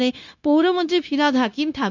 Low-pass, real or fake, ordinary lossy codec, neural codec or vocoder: 7.2 kHz; fake; none; codec, 16 kHz, 0.9 kbps, LongCat-Audio-Codec